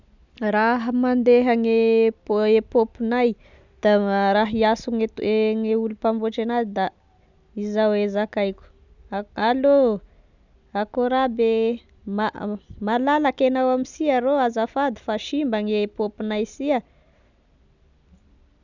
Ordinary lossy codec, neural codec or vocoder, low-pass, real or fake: none; none; 7.2 kHz; real